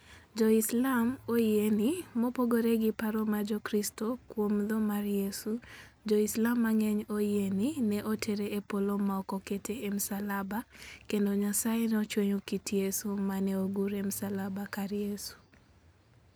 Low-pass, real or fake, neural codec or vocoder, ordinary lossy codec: none; real; none; none